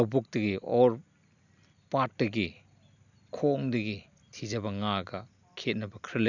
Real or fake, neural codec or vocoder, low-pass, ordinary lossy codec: real; none; 7.2 kHz; none